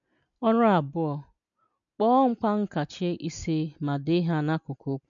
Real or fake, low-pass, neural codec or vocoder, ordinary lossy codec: real; 7.2 kHz; none; none